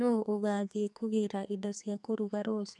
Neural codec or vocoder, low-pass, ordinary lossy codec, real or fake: codec, 32 kHz, 1.9 kbps, SNAC; 10.8 kHz; none; fake